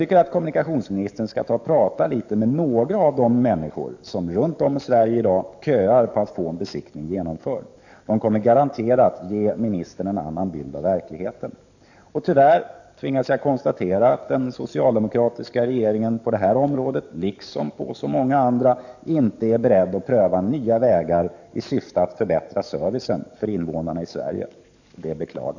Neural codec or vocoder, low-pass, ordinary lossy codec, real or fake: codec, 44.1 kHz, 7.8 kbps, DAC; 7.2 kHz; none; fake